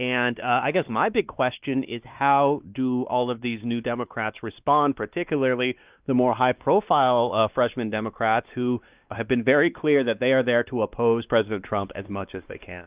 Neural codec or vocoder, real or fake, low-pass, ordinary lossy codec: codec, 16 kHz, 2 kbps, X-Codec, WavLM features, trained on Multilingual LibriSpeech; fake; 3.6 kHz; Opus, 24 kbps